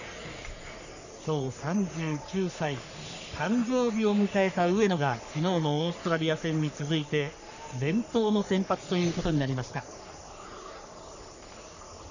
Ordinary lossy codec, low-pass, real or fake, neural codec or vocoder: none; 7.2 kHz; fake; codec, 44.1 kHz, 3.4 kbps, Pupu-Codec